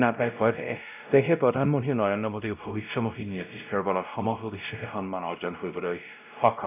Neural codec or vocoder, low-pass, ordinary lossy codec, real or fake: codec, 16 kHz, 0.5 kbps, X-Codec, WavLM features, trained on Multilingual LibriSpeech; 3.6 kHz; none; fake